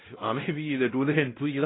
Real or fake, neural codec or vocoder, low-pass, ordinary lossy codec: fake; codec, 16 kHz, 0.5 kbps, X-Codec, WavLM features, trained on Multilingual LibriSpeech; 7.2 kHz; AAC, 16 kbps